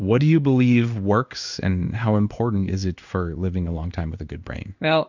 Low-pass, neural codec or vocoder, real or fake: 7.2 kHz; codec, 16 kHz in and 24 kHz out, 1 kbps, XY-Tokenizer; fake